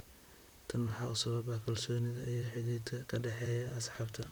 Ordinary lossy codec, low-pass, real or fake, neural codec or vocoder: none; none; fake; vocoder, 44.1 kHz, 128 mel bands, Pupu-Vocoder